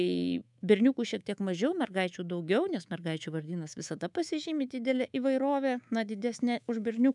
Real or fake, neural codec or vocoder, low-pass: fake; codec, 24 kHz, 3.1 kbps, DualCodec; 10.8 kHz